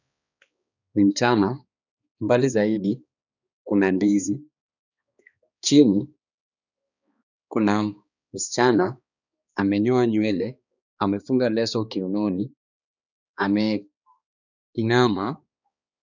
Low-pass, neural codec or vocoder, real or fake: 7.2 kHz; codec, 16 kHz, 2 kbps, X-Codec, HuBERT features, trained on balanced general audio; fake